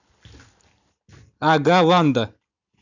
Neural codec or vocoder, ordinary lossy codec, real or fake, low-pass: none; none; real; 7.2 kHz